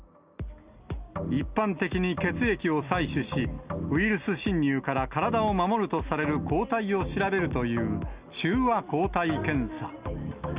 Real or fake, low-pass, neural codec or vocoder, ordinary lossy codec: real; 3.6 kHz; none; Opus, 64 kbps